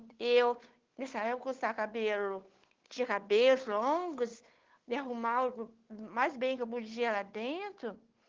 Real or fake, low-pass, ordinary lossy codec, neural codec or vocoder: fake; 7.2 kHz; Opus, 16 kbps; codec, 16 kHz, 8 kbps, FunCodec, trained on Chinese and English, 25 frames a second